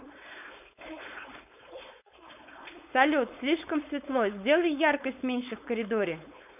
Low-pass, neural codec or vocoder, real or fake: 3.6 kHz; codec, 16 kHz, 4.8 kbps, FACodec; fake